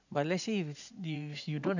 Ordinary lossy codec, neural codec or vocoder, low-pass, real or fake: none; vocoder, 44.1 kHz, 80 mel bands, Vocos; 7.2 kHz; fake